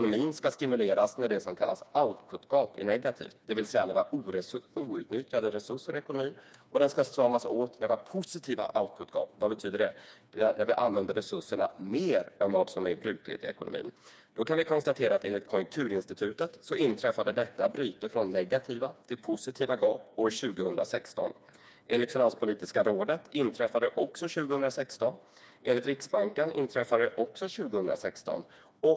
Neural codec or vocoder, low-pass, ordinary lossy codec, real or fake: codec, 16 kHz, 2 kbps, FreqCodec, smaller model; none; none; fake